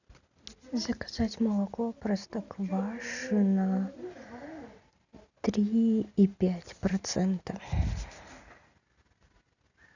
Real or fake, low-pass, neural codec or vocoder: real; 7.2 kHz; none